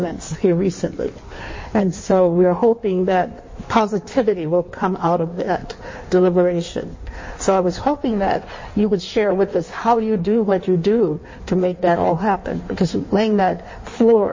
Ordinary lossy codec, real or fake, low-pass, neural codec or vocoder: MP3, 32 kbps; fake; 7.2 kHz; codec, 16 kHz in and 24 kHz out, 1.1 kbps, FireRedTTS-2 codec